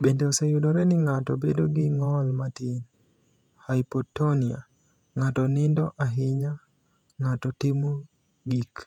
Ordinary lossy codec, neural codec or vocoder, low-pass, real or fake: none; vocoder, 48 kHz, 128 mel bands, Vocos; 19.8 kHz; fake